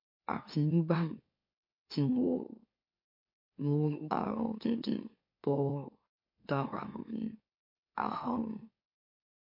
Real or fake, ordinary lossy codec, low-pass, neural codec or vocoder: fake; MP3, 32 kbps; 5.4 kHz; autoencoder, 44.1 kHz, a latent of 192 numbers a frame, MeloTTS